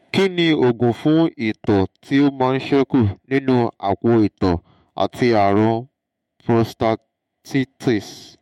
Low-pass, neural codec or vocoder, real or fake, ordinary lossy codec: 19.8 kHz; autoencoder, 48 kHz, 128 numbers a frame, DAC-VAE, trained on Japanese speech; fake; MP3, 64 kbps